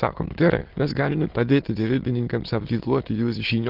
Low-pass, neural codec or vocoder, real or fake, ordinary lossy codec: 5.4 kHz; autoencoder, 22.05 kHz, a latent of 192 numbers a frame, VITS, trained on many speakers; fake; Opus, 16 kbps